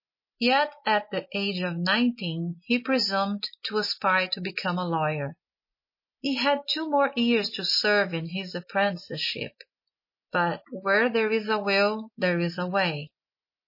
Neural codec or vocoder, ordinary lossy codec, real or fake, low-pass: none; MP3, 24 kbps; real; 5.4 kHz